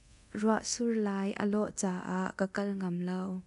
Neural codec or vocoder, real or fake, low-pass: codec, 24 kHz, 0.9 kbps, DualCodec; fake; 10.8 kHz